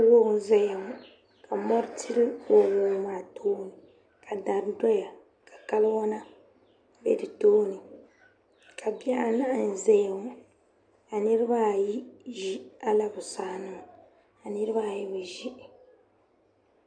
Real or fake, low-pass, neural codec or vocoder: real; 9.9 kHz; none